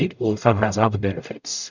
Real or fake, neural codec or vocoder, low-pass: fake; codec, 44.1 kHz, 0.9 kbps, DAC; 7.2 kHz